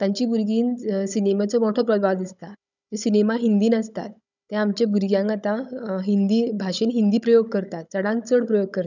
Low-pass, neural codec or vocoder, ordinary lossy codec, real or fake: 7.2 kHz; codec, 16 kHz, 16 kbps, FunCodec, trained on Chinese and English, 50 frames a second; none; fake